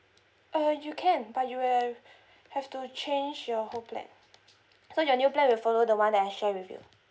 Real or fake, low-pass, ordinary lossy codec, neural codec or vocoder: real; none; none; none